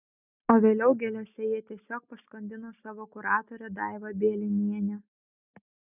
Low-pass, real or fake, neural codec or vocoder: 3.6 kHz; real; none